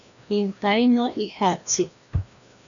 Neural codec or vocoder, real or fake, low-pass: codec, 16 kHz, 1 kbps, FreqCodec, larger model; fake; 7.2 kHz